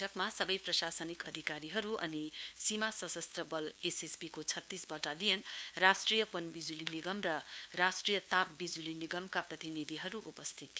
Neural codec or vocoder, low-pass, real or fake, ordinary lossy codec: codec, 16 kHz, 2 kbps, FunCodec, trained on LibriTTS, 25 frames a second; none; fake; none